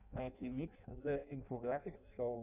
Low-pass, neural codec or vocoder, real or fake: 3.6 kHz; codec, 16 kHz in and 24 kHz out, 0.6 kbps, FireRedTTS-2 codec; fake